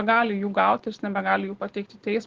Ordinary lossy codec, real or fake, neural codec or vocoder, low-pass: Opus, 16 kbps; real; none; 7.2 kHz